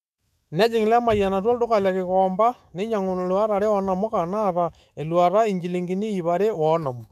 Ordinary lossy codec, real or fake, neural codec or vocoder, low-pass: none; fake; autoencoder, 48 kHz, 128 numbers a frame, DAC-VAE, trained on Japanese speech; 14.4 kHz